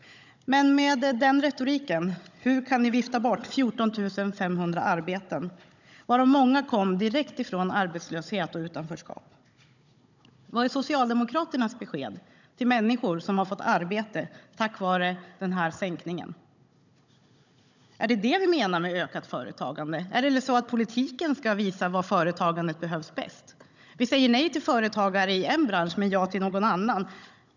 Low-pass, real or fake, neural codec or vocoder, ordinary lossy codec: 7.2 kHz; fake; codec, 16 kHz, 16 kbps, FunCodec, trained on Chinese and English, 50 frames a second; none